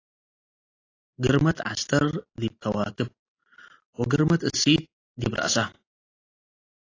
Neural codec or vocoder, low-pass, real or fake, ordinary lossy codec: none; 7.2 kHz; real; AAC, 32 kbps